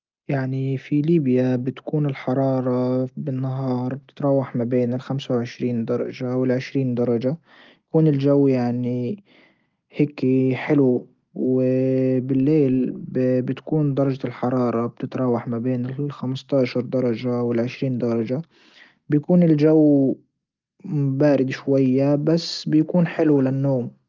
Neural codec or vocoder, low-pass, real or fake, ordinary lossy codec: none; 7.2 kHz; real; Opus, 32 kbps